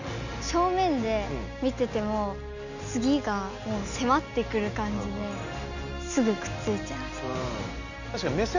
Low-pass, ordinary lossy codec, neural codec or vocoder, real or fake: 7.2 kHz; none; none; real